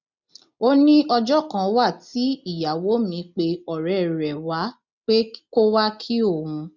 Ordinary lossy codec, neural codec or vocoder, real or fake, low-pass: none; none; real; 7.2 kHz